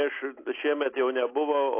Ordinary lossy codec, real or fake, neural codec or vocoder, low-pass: AAC, 24 kbps; real; none; 3.6 kHz